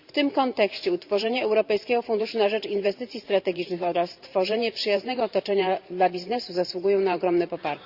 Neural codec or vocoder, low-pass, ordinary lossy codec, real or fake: vocoder, 44.1 kHz, 128 mel bands every 512 samples, BigVGAN v2; 5.4 kHz; Opus, 64 kbps; fake